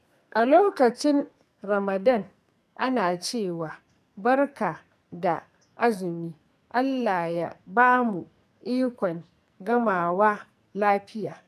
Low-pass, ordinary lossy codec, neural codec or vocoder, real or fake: 14.4 kHz; none; codec, 44.1 kHz, 2.6 kbps, SNAC; fake